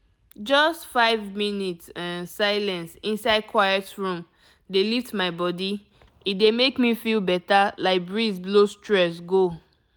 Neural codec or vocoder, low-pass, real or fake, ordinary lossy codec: none; none; real; none